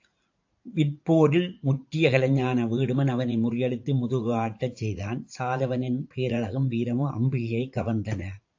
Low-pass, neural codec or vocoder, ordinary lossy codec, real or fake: 7.2 kHz; vocoder, 44.1 kHz, 80 mel bands, Vocos; AAC, 48 kbps; fake